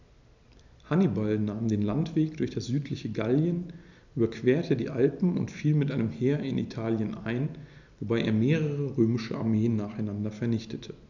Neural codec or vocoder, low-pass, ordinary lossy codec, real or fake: none; 7.2 kHz; none; real